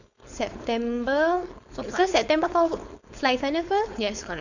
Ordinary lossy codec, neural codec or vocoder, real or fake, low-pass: none; codec, 16 kHz, 4.8 kbps, FACodec; fake; 7.2 kHz